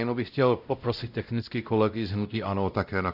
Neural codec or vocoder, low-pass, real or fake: codec, 16 kHz, 0.5 kbps, X-Codec, WavLM features, trained on Multilingual LibriSpeech; 5.4 kHz; fake